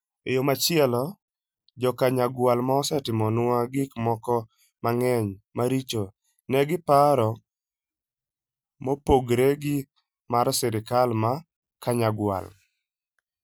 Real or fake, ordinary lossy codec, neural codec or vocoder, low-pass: real; none; none; none